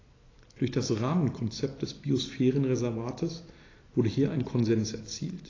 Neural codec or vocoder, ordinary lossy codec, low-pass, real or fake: none; AAC, 32 kbps; 7.2 kHz; real